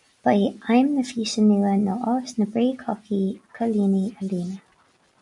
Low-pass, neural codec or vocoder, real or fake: 10.8 kHz; none; real